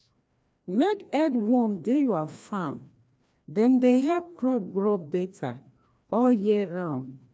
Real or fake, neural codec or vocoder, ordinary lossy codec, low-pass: fake; codec, 16 kHz, 1 kbps, FreqCodec, larger model; none; none